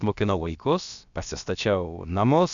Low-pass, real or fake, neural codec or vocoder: 7.2 kHz; fake; codec, 16 kHz, about 1 kbps, DyCAST, with the encoder's durations